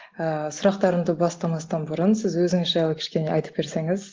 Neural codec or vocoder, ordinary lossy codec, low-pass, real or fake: none; Opus, 16 kbps; 7.2 kHz; real